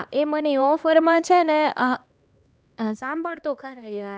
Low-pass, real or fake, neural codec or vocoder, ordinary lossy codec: none; fake; codec, 16 kHz, 2 kbps, X-Codec, HuBERT features, trained on LibriSpeech; none